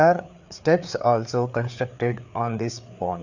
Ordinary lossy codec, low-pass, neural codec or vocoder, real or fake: none; 7.2 kHz; codec, 16 kHz, 4 kbps, FreqCodec, larger model; fake